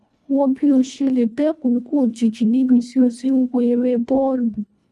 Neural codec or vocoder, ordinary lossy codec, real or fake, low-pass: codec, 24 kHz, 1.5 kbps, HILCodec; none; fake; none